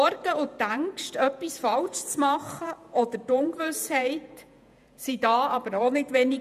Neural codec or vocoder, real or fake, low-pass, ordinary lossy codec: vocoder, 48 kHz, 128 mel bands, Vocos; fake; 14.4 kHz; none